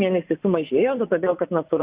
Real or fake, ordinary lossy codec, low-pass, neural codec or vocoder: fake; Opus, 64 kbps; 3.6 kHz; vocoder, 24 kHz, 100 mel bands, Vocos